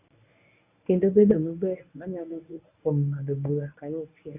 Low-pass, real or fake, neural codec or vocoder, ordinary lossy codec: 3.6 kHz; fake; codec, 24 kHz, 0.9 kbps, WavTokenizer, medium speech release version 1; none